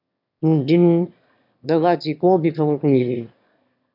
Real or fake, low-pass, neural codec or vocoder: fake; 5.4 kHz; autoencoder, 22.05 kHz, a latent of 192 numbers a frame, VITS, trained on one speaker